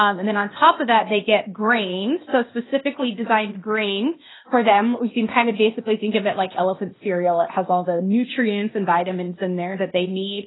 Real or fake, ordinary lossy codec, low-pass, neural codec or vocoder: fake; AAC, 16 kbps; 7.2 kHz; codec, 16 kHz, 0.8 kbps, ZipCodec